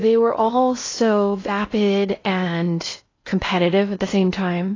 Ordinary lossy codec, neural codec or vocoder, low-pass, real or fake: AAC, 32 kbps; codec, 16 kHz in and 24 kHz out, 0.6 kbps, FocalCodec, streaming, 2048 codes; 7.2 kHz; fake